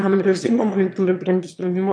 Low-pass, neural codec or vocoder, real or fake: 9.9 kHz; autoencoder, 22.05 kHz, a latent of 192 numbers a frame, VITS, trained on one speaker; fake